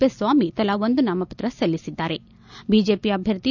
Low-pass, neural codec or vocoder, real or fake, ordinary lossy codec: 7.2 kHz; none; real; none